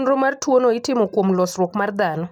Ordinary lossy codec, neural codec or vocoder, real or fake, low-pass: none; none; real; 19.8 kHz